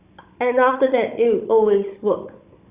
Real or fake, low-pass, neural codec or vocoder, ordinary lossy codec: fake; 3.6 kHz; codec, 16 kHz, 16 kbps, FunCodec, trained on Chinese and English, 50 frames a second; Opus, 64 kbps